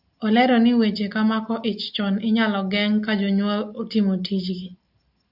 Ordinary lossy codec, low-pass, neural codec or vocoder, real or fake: AAC, 48 kbps; 5.4 kHz; none; real